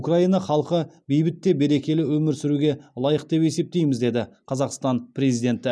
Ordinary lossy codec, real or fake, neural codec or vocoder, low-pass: none; real; none; none